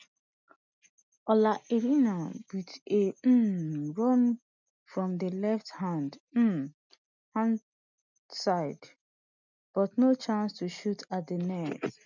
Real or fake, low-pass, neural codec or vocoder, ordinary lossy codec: real; 7.2 kHz; none; none